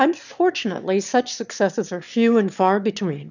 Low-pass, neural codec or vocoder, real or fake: 7.2 kHz; autoencoder, 22.05 kHz, a latent of 192 numbers a frame, VITS, trained on one speaker; fake